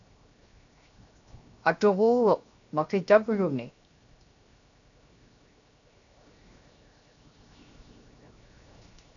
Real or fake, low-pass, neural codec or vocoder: fake; 7.2 kHz; codec, 16 kHz, 0.7 kbps, FocalCodec